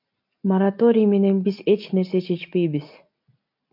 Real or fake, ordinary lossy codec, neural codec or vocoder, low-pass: real; MP3, 48 kbps; none; 5.4 kHz